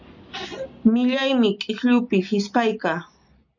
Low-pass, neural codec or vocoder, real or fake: 7.2 kHz; none; real